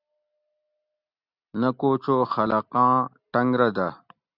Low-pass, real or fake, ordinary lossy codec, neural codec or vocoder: 5.4 kHz; real; Opus, 64 kbps; none